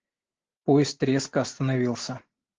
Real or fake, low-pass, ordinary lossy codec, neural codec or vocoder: real; 7.2 kHz; Opus, 16 kbps; none